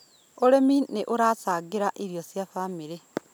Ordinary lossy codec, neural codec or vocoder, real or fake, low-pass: none; none; real; 19.8 kHz